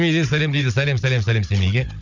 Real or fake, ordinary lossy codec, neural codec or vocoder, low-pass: fake; none; codec, 16 kHz, 16 kbps, FunCodec, trained on Chinese and English, 50 frames a second; 7.2 kHz